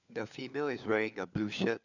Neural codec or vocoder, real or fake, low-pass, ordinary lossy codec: codec, 16 kHz, 4 kbps, FunCodec, trained on LibriTTS, 50 frames a second; fake; 7.2 kHz; none